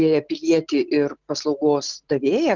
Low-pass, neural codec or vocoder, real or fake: 7.2 kHz; none; real